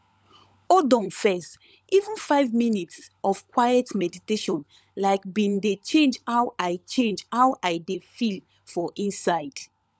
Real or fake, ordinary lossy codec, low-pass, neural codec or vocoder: fake; none; none; codec, 16 kHz, 16 kbps, FunCodec, trained on LibriTTS, 50 frames a second